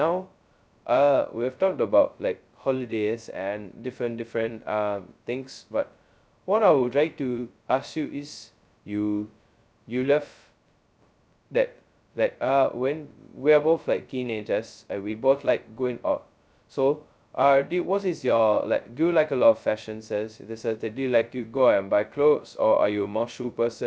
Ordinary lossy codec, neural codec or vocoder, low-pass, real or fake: none; codec, 16 kHz, 0.2 kbps, FocalCodec; none; fake